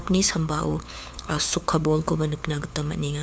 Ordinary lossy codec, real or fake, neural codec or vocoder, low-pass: none; fake; codec, 16 kHz, 4 kbps, FunCodec, trained on LibriTTS, 50 frames a second; none